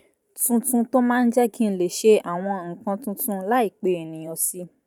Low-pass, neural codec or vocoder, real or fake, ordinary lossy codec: 19.8 kHz; vocoder, 44.1 kHz, 128 mel bands every 512 samples, BigVGAN v2; fake; none